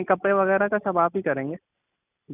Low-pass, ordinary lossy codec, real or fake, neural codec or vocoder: 3.6 kHz; none; real; none